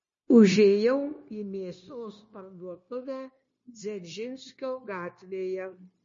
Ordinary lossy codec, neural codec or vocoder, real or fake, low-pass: MP3, 32 kbps; codec, 16 kHz, 0.9 kbps, LongCat-Audio-Codec; fake; 7.2 kHz